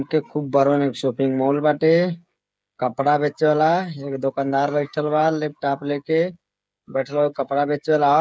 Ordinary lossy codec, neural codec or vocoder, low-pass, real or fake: none; codec, 16 kHz, 8 kbps, FreqCodec, smaller model; none; fake